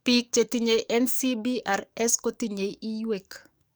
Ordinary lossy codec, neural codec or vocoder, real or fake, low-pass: none; codec, 44.1 kHz, 7.8 kbps, DAC; fake; none